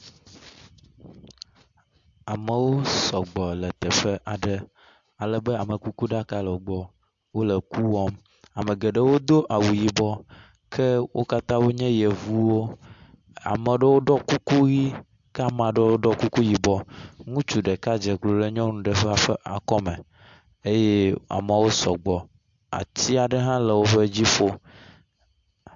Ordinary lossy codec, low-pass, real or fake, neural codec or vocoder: AAC, 64 kbps; 7.2 kHz; real; none